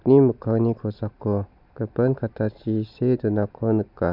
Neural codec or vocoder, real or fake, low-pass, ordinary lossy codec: none; real; 5.4 kHz; none